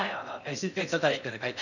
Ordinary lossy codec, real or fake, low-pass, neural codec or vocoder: none; fake; 7.2 kHz; codec, 16 kHz in and 24 kHz out, 0.6 kbps, FocalCodec, streaming, 4096 codes